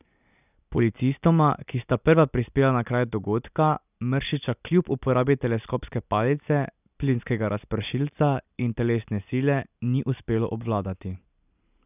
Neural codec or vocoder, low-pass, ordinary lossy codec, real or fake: none; 3.6 kHz; none; real